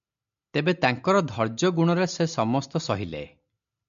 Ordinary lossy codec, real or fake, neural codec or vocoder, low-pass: MP3, 64 kbps; real; none; 7.2 kHz